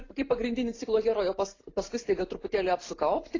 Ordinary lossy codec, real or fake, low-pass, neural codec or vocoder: AAC, 32 kbps; real; 7.2 kHz; none